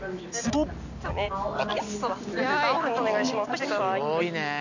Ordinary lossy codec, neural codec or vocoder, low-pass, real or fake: none; none; 7.2 kHz; real